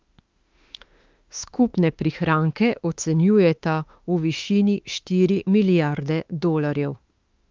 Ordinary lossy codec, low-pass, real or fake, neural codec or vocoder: Opus, 24 kbps; 7.2 kHz; fake; autoencoder, 48 kHz, 32 numbers a frame, DAC-VAE, trained on Japanese speech